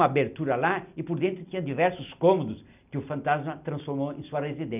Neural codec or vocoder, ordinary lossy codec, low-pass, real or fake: none; none; 3.6 kHz; real